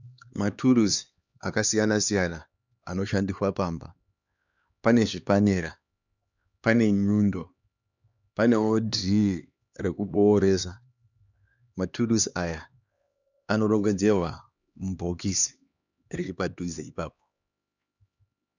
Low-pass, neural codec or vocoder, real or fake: 7.2 kHz; codec, 16 kHz, 2 kbps, X-Codec, HuBERT features, trained on LibriSpeech; fake